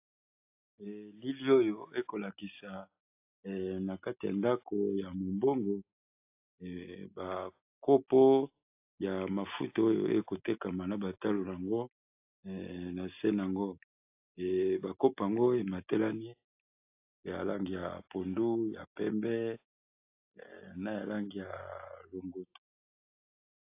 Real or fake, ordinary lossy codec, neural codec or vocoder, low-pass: real; AAC, 32 kbps; none; 3.6 kHz